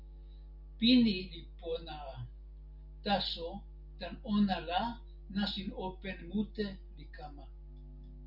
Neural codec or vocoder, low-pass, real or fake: none; 5.4 kHz; real